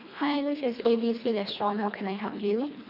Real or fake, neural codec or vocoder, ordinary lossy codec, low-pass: fake; codec, 24 kHz, 1.5 kbps, HILCodec; none; 5.4 kHz